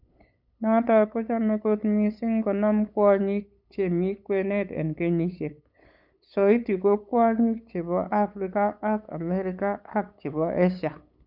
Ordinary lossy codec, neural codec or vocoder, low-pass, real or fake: none; codec, 16 kHz, 8 kbps, FunCodec, trained on LibriTTS, 25 frames a second; 5.4 kHz; fake